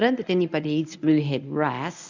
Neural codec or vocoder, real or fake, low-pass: codec, 24 kHz, 0.9 kbps, WavTokenizer, medium speech release version 1; fake; 7.2 kHz